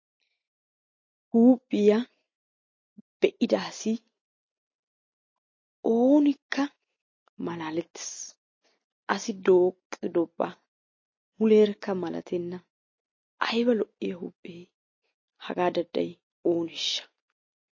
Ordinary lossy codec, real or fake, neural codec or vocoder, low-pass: MP3, 32 kbps; real; none; 7.2 kHz